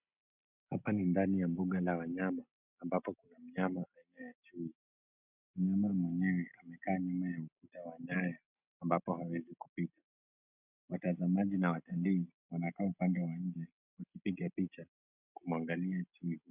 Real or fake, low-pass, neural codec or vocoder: real; 3.6 kHz; none